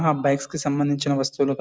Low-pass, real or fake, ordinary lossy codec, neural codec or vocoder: none; real; none; none